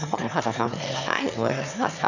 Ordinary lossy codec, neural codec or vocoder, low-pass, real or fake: none; autoencoder, 22.05 kHz, a latent of 192 numbers a frame, VITS, trained on one speaker; 7.2 kHz; fake